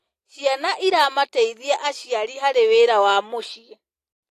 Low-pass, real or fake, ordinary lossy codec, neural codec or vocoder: 14.4 kHz; real; AAC, 48 kbps; none